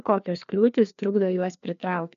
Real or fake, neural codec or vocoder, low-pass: fake; codec, 16 kHz, 2 kbps, FreqCodec, larger model; 7.2 kHz